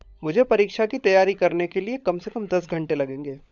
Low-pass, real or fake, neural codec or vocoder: 7.2 kHz; fake; codec, 16 kHz, 16 kbps, FunCodec, trained on LibriTTS, 50 frames a second